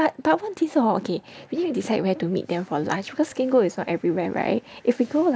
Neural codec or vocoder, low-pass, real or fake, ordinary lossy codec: none; none; real; none